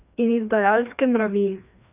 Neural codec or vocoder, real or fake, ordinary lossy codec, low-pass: codec, 16 kHz, 2 kbps, FreqCodec, larger model; fake; none; 3.6 kHz